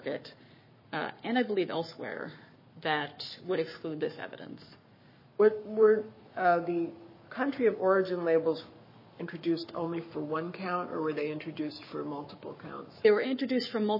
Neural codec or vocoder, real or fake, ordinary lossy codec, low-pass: codec, 44.1 kHz, 7.8 kbps, Pupu-Codec; fake; MP3, 24 kbps; 5.4 kHz